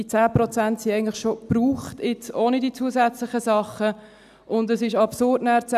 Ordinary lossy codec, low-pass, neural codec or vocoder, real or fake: none; 14.4 kHz; none; real